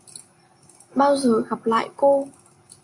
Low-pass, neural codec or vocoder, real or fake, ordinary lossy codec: 10.8 kHz; none; real; AAC, 64 kbps